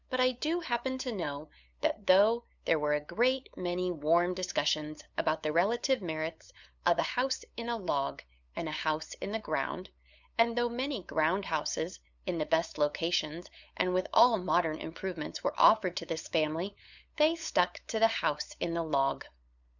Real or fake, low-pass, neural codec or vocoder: fake; 7.2 kHz; codec, 16 kHz, 8 kbps, FunCodec, trained on LibriTTS, 25 frames a second